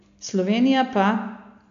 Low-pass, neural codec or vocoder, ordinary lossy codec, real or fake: 7.2 kHz; none; AAC, 64 kbps; real